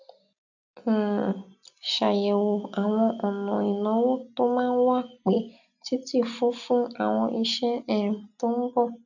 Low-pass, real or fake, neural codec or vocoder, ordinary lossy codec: 7.2 kHz; real; none; none